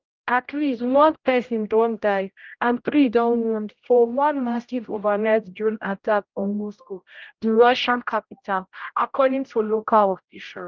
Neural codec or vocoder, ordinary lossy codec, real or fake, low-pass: codec, 16 kHz, 0.5 kbps, X-Codec, HuBERT features, trained on general audio; Opus, 24 kbps; fake; 7.2 kHz